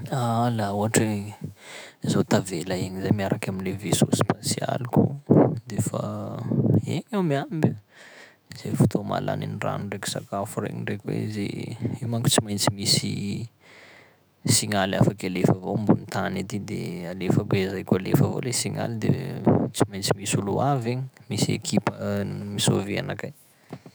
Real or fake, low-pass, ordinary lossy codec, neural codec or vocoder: fake; none; none; autoencoder, 48 kHz, 128 numbers a frame, DAC-VAE, trained on Japanese speech